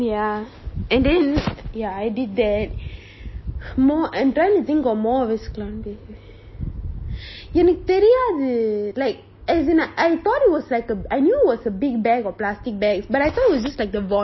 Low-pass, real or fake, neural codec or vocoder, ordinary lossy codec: 7.2 kHz; real; none; MP3, 24 kbps